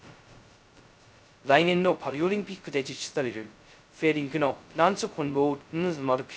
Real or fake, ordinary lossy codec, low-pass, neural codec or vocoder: fake; none; none; codec, 16 kHz, 0.2 kbps, FocalCodec